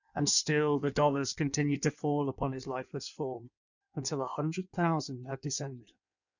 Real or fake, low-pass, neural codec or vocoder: fake; 7.2 kHz; codec, 16 kHz in and 24 kHz out, 1.1 kbps, FireRedTTS-2 codec